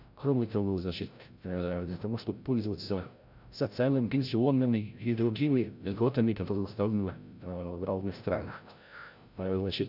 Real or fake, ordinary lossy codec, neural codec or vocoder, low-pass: fake; none; codec, 16 kHz, 0.5 kbps, FreqCodec, larger model; 5.4 kHz